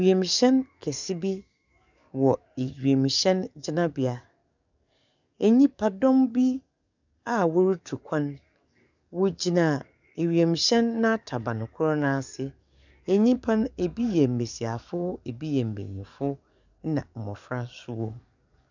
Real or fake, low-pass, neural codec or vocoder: fake; 7.2 kHz; codec, 44.1 kHz, 7.8 kbps, DAC